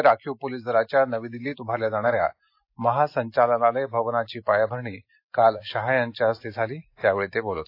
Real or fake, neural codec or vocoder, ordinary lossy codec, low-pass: real; none; AAC, 32 kbps; 5.4 kHz